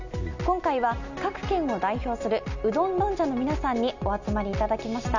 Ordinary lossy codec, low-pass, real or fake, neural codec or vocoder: none; 7.2 kHz; real; none